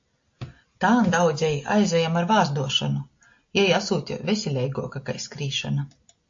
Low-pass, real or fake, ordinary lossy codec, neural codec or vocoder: 7.2 kHz; real; AAC, 48 kbps; none